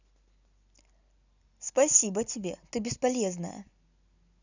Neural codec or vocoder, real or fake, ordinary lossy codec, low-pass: none; real; none; 7.2 kHz